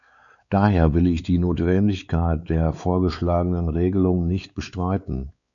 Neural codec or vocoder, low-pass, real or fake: codec, 16 kHz, 4 kbps, X-Codec, WavLM features, trained on Multilingual LibriSpeech; 7.2 kHz; fake